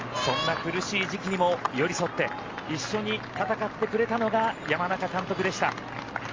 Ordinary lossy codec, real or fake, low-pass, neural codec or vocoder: Opus, 32 kbps; real; 7.2 kHz; none